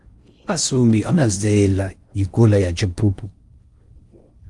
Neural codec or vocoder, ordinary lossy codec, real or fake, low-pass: codec, 16 kHz in and 24 kHz out, 0.6 kbps, FocalCodec, streaming, 4096 codes; Opus, 32 kbps; fake; 10.8 kHz